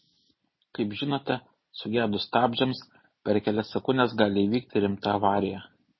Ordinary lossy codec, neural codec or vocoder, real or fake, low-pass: MP3, 24 kbps; none; real; 7.2 kHz